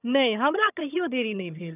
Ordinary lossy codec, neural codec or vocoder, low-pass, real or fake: none; vocoder, 22.05 kHz, 80 mel bands, HiFi-GAN; 3.6 kHz; fake